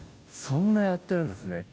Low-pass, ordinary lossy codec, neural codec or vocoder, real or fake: none; none; codec, 16 kHz, 0.5 kbps, FunCodec, trained on Chinese and English, 25 frames a second; fake